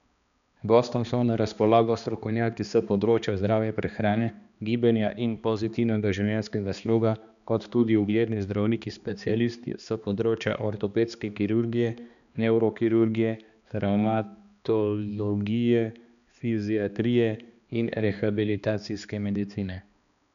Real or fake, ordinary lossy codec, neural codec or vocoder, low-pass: fake; none; codec, 16 kHz, 2 kbps, X-Codec, HuBERT features, trained on balanced general audio; 7.2 kHz